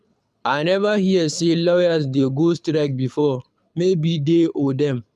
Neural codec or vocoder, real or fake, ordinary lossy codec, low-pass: codec, 24 kHz, 6 kbps, HILCodec; fake; none; none